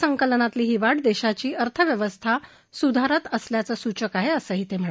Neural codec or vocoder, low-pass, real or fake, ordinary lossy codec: none; none; real; none